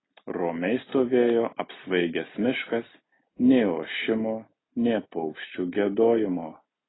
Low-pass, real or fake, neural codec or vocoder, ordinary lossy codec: 7.2 kHz; real; none; AAC, 16 kbps